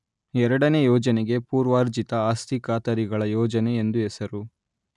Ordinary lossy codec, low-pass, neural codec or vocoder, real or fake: none; 10.8 kHz; none; real